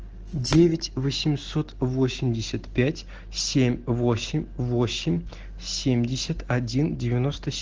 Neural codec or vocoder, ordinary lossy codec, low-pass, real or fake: none; Opus, 16 kbps; 7.2 kHz; real